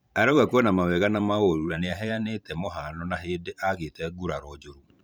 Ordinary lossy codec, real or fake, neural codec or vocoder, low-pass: none; fake; vocoder, 44.1 kHz, 128 mel bands every 512 samples, BigVGAN v2; none